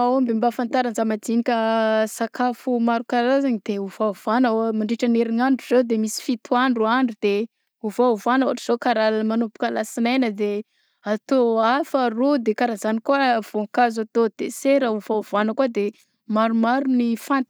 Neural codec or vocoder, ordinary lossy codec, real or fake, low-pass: none; none; real; none